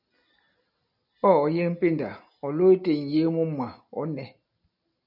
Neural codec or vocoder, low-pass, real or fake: none; 5.4 kHz; real